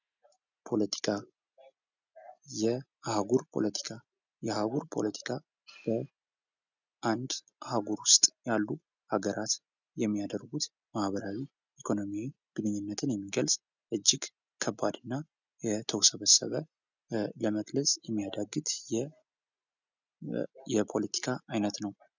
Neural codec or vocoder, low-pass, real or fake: none; 7.2 kHz; real